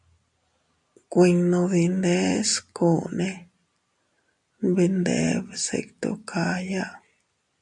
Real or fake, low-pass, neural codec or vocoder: real; 10.8 kHz; none